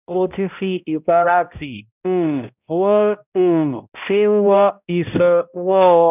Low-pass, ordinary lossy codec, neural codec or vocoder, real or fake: 3.6 kHz; none; codec, 16 kHz, 0.5 kbps, X-Codec, HuBERT features, trained on balanced general audio; fake